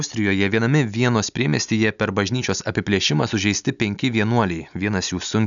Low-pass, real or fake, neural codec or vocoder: 7.2 kHz; real; none